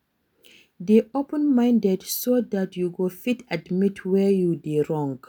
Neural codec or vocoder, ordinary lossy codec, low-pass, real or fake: none; none; none; real